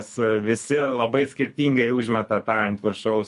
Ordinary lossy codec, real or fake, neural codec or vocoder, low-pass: MP3, 64 kbps; fake; codec, 24 kHz, 3 kbps, HILCodec; 10.8 kHz